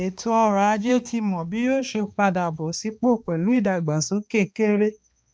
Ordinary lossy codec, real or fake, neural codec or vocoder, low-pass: none; fake; codec, 16 kHz, 2 kbps, X-Codec, HuBERT features, trained on balanced general audio; none